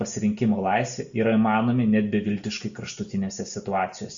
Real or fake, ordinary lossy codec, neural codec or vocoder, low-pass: real; Opus, 64 kbps; none; 7.2 kHz